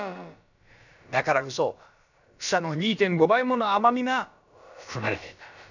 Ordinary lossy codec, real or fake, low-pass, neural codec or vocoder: none; fake; 7.2 kHz; codec, 16 kHz, about 1 kbps, DyCAST, with the encoder's durations